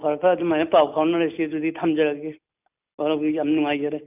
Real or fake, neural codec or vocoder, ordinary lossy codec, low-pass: real; none; none; 3.6 kHz